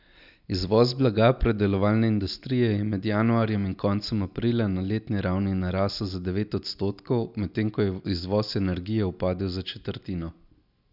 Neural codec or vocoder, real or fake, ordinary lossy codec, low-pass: none; real; none; 5.4 kHz